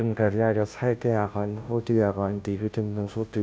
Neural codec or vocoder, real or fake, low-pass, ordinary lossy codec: codec, 16 kHz, 0.5 kbps, FunCodec, trained on Chinese and English, 25 frames a second; fake; none; none